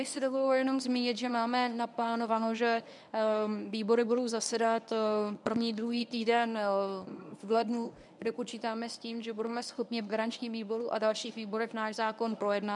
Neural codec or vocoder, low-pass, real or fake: codec, 24 kHz, 0.9 kbps, WavTokenizer, medium speech release version 1; 10.8 kHz; fake